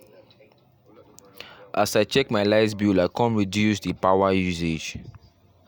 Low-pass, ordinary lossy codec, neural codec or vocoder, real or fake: 19.8 kHz; none; none; real